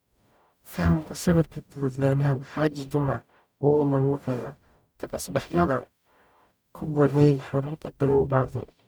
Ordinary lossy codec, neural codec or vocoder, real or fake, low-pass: none; codec, 44.1 kHz, 0.9 kbps, DAC; fake; none